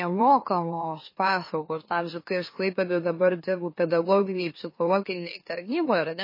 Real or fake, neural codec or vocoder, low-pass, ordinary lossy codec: fake; autoencoder, 44.1 kHz, a latent of 192 numbers a frame, MeloTTS; 5.4 kHz; MP3, 24 kbps